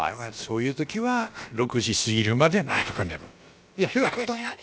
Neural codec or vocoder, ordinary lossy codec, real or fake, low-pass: codec, 16 kHz, about 1 kbps, DyCAST, with the encoder's durations; none; fake; none